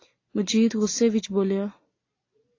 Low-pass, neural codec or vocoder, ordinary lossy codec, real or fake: 7.2 kHz; none; AAC, 32 kbps; real